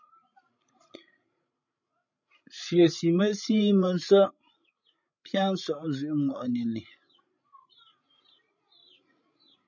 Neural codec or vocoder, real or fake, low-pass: codec, 16 kHz, 16 kbps, FreqCodec, larger model; fake; 7.2 kHz